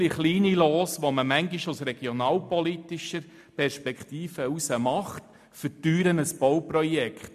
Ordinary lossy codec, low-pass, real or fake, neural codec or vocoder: MP3, 96 kbps; 14.4 kHz; real; none